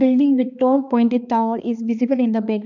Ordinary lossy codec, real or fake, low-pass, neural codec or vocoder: none; fake; 7.2 kHz; codec, 16 kHz, 2 kbps, X-Codec, HuBERT features, trained on balanced general audio